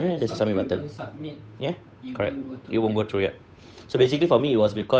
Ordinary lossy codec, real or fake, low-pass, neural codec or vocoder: none; fake; none; codec, 16 kHz, 8 kbps, FunCodec, trained on Chinese and English, 25 frames a second